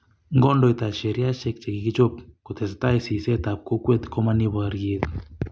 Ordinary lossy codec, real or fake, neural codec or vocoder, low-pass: none; real; none; none